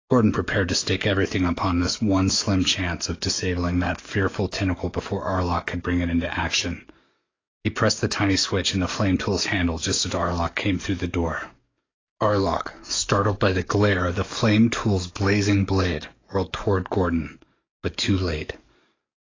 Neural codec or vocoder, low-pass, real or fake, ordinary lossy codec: codec, 44.1 kHz, 7.8 kbps, DAC; 7.2 kHz; fake; AAC, 32 kbps